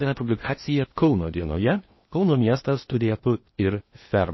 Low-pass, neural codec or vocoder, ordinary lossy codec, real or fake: 7.2 kHz; codec, 16 kHz in and 24 kHz out, 0.8 kbps, FocalCodec, streaming, 65536 codes; MP3, 24 kbps; fake